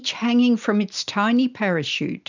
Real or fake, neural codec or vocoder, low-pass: real; none; 7.2 kHz